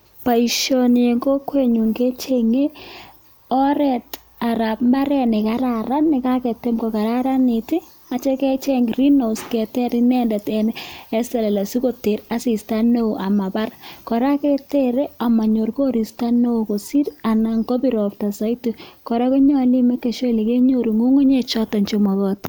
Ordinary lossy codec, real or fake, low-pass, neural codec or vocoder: none; real; none; none